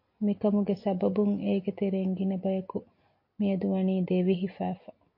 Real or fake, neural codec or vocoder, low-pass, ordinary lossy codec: real; none; 5.4 kHz; MP3, 32 kbps